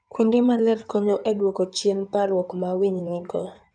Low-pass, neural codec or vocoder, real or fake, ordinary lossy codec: 9.9 kHz; codec, 16 kHz in and 24 kHz out, 2.2 kbps, FireRedTTS-2 codec; fake; none